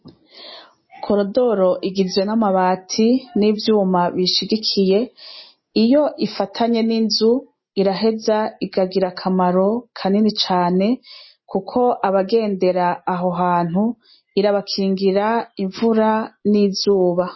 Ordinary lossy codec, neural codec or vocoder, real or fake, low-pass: MP3, 24 kbps; none; real; 7.2 kHz